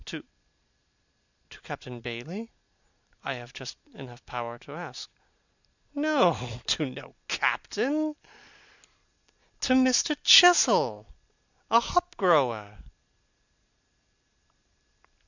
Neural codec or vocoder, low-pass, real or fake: none; 7.2 kHz; real